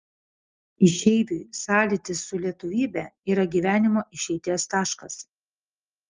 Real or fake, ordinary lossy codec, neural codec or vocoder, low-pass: real; Opus, 24 kbps; none; 7.2 kHz